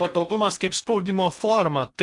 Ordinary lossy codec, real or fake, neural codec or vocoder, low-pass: MP3, 96 kbps; fake; codec, 16 kHz in and 24 kHz out, 0.8 kbps, FocalCodec, streaming, 65536 codes; 10.8 kHz